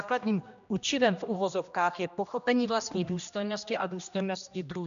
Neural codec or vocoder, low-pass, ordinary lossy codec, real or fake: codec, 16 kHz, 1 kbps, X-Codec, HuBERT features, trained on general audio; 7.2 kHz; MP3, 96 kbps; fake